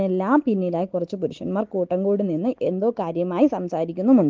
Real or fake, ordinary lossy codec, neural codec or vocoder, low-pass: real; Opus, 16 kbps; none; 7.2 kHz